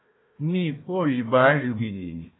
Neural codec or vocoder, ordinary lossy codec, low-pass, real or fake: codec, 16 kHz, 1 kbps, FunCodec, trained on Chinese and English, 50 frames a second; AAC, 16 kbps; 7.2 kHz; fake